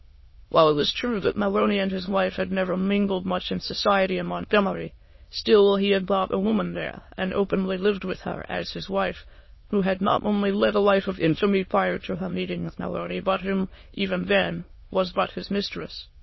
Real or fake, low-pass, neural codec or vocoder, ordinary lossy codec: fake; 7.2 kHz; autoencoder, 22.05 kHz, a latent of 192 numbers a frame, VITS, trained on many speakers; MP3, 24 kbps